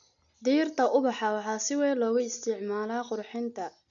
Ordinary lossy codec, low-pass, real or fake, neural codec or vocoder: none; 7.2 kHz; real; none